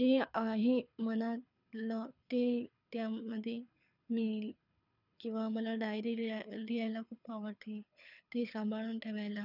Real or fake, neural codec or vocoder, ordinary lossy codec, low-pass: fake; codec, 24 kHz, 6 kbps, HILCodec; none; 5.4 kHz